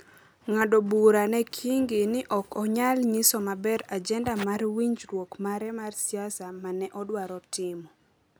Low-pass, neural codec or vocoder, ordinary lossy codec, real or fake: none; none; none; real